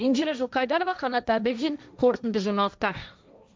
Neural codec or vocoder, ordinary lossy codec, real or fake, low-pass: codec, 16 kHz, 1.1 kbps, Voila-Tokenizer; none; fake; none